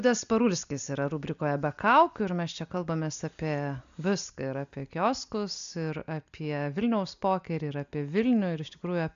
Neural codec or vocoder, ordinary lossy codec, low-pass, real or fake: none; AAC, 96 kbps; 7.2 kHz; real